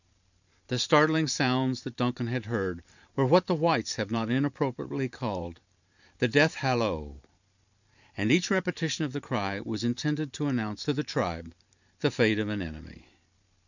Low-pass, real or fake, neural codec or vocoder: 7.2 kHz; fake; vocoder, 44.1 kHz, 128 mel bands every 512 samples, BigVGAN v2